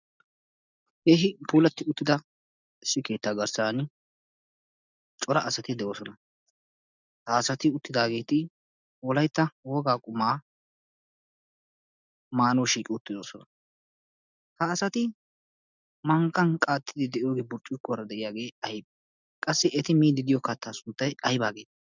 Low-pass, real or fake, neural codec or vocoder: 7.2 kHz; real; none